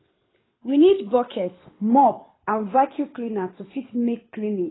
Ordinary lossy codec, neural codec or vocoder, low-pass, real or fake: AAC, 16 kbps; codec, 24 kHz, 6 kbps, HILCodec; 7.2 kHz; fake